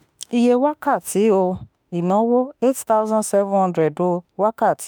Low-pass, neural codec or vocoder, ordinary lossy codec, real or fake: none; autoencoder, 48 kHz, 32 numbers a frame, DAC-VAE, trained on Japanese speech; none; fake